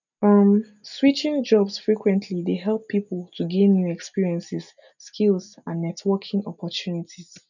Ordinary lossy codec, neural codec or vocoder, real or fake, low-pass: none; none; real; 7.2 kHz